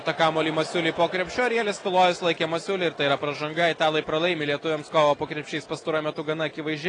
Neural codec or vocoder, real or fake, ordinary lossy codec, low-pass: none; real; AAC, 32 kbps; 9.9 kHz